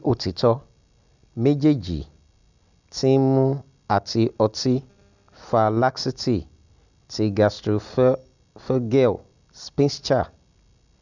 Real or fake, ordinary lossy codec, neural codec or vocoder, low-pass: real; none; none; 7.2 kHz